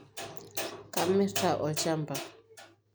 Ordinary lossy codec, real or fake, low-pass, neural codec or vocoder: none; real; none; none